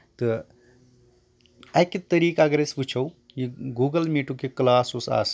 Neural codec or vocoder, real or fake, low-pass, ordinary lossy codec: none; real; none; none